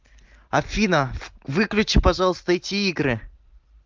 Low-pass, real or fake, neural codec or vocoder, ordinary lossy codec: 7.2 kHz; real; none; Opus, 24 kbps